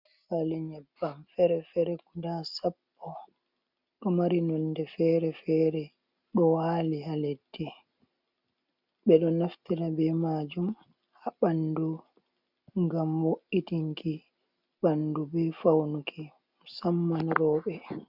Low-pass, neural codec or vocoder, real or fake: 5.4 kHz; none; real